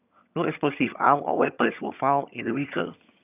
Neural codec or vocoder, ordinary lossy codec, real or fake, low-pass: vocoder, 22.05 kHz, 80 mel bands, HiFi-GAN; none; fake; 3.6 kHz